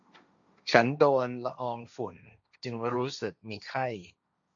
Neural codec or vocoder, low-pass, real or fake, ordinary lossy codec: codec, 16 kHz, 1.1 kbps, Voila-Tokenizer; 7.2 kHz; fake; MP3, 64 kbps